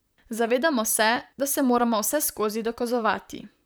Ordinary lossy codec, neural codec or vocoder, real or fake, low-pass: none; vocoder, 44.1 kHz, 128 mel bands, Pupu-Vocoder; fake; none